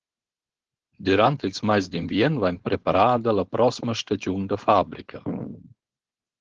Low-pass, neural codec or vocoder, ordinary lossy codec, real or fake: 7.2 kHz; codec, 16 kHz, 4.8 kbps, FACodec; Opus, 16 kbps; fake